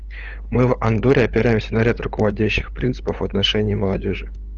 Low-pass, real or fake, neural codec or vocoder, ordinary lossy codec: 7.2 kHz; fake; codec, 16 kHz, 8 kbps, FunCodec, trained on LibriTTS, 25 frames a second; Opus, 16 kbps